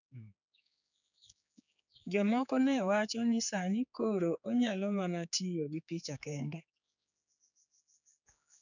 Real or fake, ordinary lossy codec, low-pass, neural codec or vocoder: fake; none; 7.2 kHz; codec, 16 kHz, 4 kbps, X-Codec, HuBERT features, trained on general audio